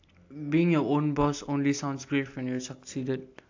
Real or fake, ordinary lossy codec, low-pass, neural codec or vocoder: real; AAC, 48 kbps; 7.2 kHz; none